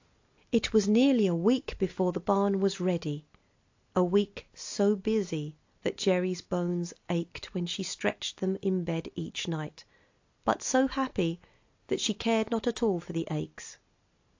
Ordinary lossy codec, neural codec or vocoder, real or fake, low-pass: AAC, 48 kbps; none; real; 7.2 kHz